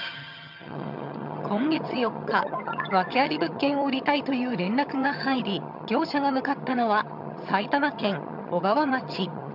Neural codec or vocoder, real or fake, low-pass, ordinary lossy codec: vocoder, 22.05 kHz, 80 mel bands, HiFi-GAN; fake; 5.4 kHz; none